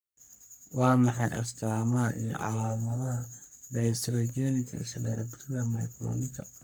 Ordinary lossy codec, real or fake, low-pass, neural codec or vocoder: none; fake; none; codec, 44.1 kHz, 3.4 kbps, Pupu-Codec